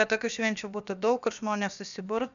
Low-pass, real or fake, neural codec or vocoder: 7.2 kHz; fake; codec, 16 kHz, about 1 kbps, DyCAST, with the encoder's durations